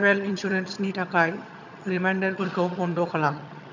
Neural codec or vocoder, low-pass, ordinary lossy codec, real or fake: vocoder, 22.05 kHz, 80 mel bands, HiFi-GAN; 7.2 kHz; none; fake